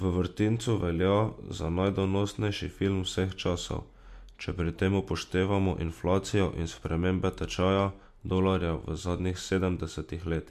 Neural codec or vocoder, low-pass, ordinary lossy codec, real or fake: none; 14.4 kHz; MP3, 64 kbps; real